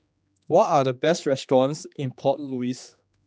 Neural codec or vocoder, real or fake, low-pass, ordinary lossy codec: codec, 16 kHz, 2 kbps, X-Codec, HuBERT features, trained on general audio; fake; none; none